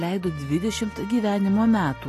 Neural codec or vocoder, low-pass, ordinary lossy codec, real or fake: none; 14.4 kHz; AAC, 48 kbps; real